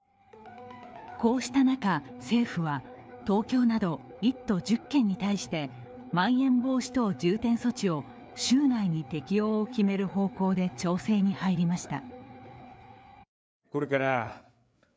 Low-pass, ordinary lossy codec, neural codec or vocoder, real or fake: none; none; codec, 16 kHz, 4 kbps, FreqCodec, larger model; fake